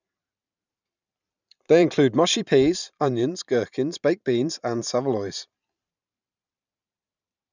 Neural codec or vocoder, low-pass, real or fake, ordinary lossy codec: vocoder, 44.1 kHz, 128 mel bands every 512 samples, BigVGAN v2; 7.2 kHz; fake; none